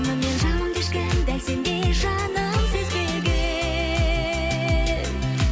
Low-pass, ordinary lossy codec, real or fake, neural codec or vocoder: none; none; real; none